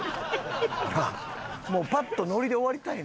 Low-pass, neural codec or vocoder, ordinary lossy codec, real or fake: none; none; none; real